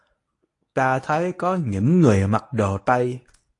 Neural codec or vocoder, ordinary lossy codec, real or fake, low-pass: codec, 24 kHz, 0.9 kbps, WavTokenizer, medium speech release version 1; AAC, 48 kbps; fake; 10.8 kHz